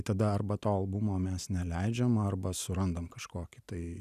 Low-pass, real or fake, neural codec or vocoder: 14.4 kHz; real; none